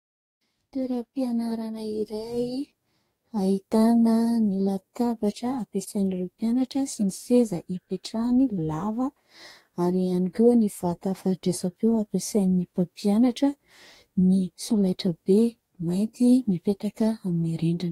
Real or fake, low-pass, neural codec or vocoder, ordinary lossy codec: fake; 19.8 kHz; codec, 44.1 kHz, 2.6 kbps, DAC; AAC, 48 kbps